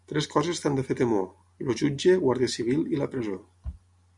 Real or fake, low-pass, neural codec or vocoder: real; 10.8 kHz; none